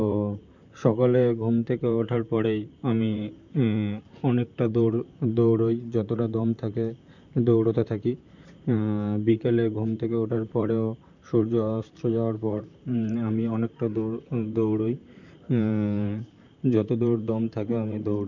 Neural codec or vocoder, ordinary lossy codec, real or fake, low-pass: vocoder, 44.1 kHz, 128 mel bands, Pupu-Vocoder; none; fake; 7.2 kHz